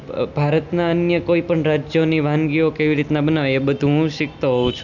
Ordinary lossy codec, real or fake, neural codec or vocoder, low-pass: none; real; none; 7.2 kHz